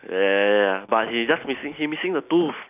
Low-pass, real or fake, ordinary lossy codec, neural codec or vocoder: 3.6 kHz; real; none; none